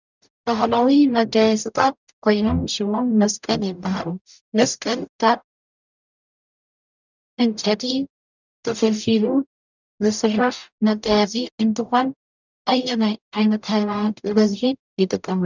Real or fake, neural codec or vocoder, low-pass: fake; codec, 44.1 kHz, 0.9 kbps, DAC; 7.2 kHz